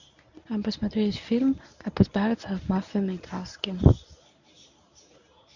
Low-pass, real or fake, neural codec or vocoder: 7.2 kHz; fake; codec, 24 kHz, 0.9 kbps, WavTokenizer, medium speech release version 1